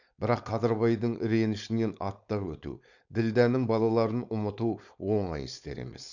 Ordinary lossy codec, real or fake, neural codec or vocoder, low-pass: none; fake; codec, 16 kHz, 4.8 kbps, FACodec; 7.2 kHz